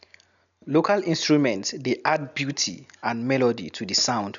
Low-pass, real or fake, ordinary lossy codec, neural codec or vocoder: 7.2 kHz; real; none; none